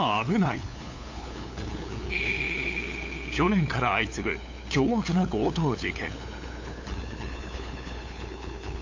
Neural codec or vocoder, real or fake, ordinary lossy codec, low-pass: codec, 16 kHz, 8 kbps, FunCodec, trained on LibriTTS, 25 frames a second; fake; AAC, 48 kbps; 7.2 kHz